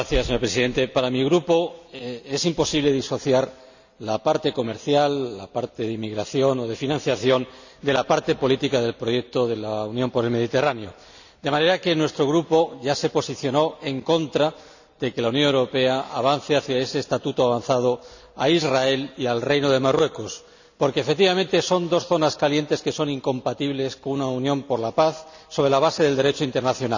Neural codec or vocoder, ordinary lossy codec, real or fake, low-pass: none; none; real; 7.2 kHz